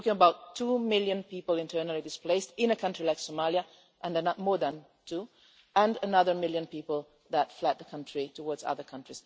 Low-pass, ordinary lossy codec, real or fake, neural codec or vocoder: none; none; real; none